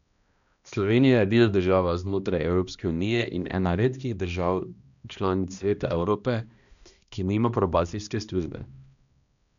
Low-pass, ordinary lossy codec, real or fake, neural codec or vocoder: 7.2 kHz; none; fake; codec, 16 kHz, 1 kbps, X-Codec, HuBERT features, trained on balanced general audio